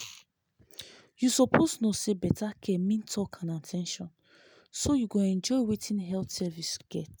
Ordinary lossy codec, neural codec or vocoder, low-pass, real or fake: none; none; none; real